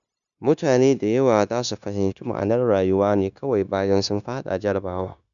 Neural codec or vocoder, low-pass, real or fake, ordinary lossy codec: codec, 16 kHz, 0.9 kbps, LongCat-Audio-Codec; 7.2 kHz; fake; none